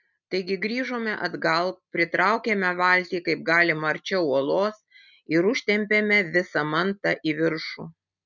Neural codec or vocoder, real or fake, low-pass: none; real; 7.2 kHz